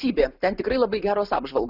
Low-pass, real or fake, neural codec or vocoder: 5.4 kHz; real; none